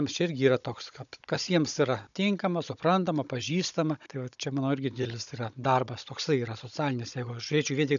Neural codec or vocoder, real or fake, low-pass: codec, 16 kHz, 16 kbps, FunCodec, trained on Chinese and English, 50 frames a second; fake; 7.2 kHz